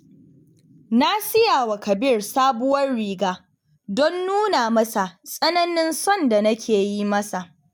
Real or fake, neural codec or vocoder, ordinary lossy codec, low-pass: real; none; none; none